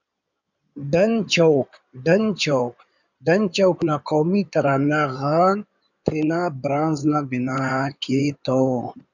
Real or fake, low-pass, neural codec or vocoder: fake; 7.2 kHz; codec, 16 kHz in and 24 kHz out, 2.2 kbps, FireRedTTS-2 codec